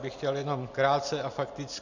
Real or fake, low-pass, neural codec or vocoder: real; 7.2 kHz; none